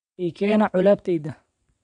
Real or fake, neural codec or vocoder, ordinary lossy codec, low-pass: fake; vocoder, 22.05 kHz, 80 mel bands, WaveNeXt; none; 9.9 kHz